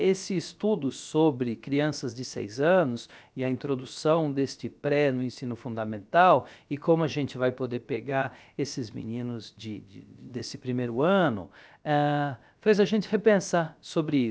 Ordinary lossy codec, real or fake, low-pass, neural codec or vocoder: none; fake; none; codec, 16 kHz, about 1 kbps, DyCAST, with the encoder's durations